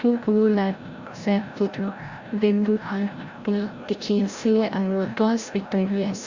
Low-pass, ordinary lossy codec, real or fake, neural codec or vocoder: 7.2 kHz; Opus, 64 kbps; fake; codec, 16 kHz, 0.5 kbps, FreqCodec, larger model